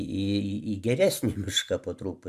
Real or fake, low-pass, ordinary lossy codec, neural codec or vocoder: real; 14.4 kHz; MP3, 96 kbps; none